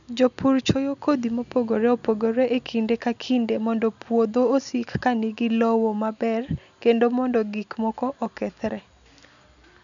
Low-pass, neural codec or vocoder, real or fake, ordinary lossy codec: 7.2 kHz; none; real; none